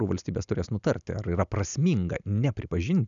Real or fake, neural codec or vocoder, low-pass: real; none; 7.2 kHz